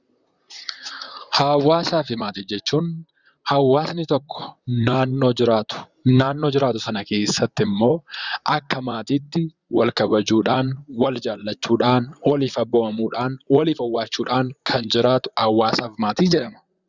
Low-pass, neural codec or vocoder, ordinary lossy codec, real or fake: 7.2 kHz; vocoder, 44.1 kHz, 128 mel bands, Pupu-Vocoder; Opus, 64 kbps; fake